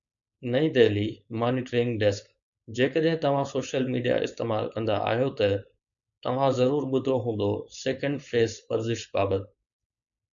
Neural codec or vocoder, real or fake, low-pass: codec, 16 kHz, 4.8 kbps, FACodec; fake; 7.2 kHz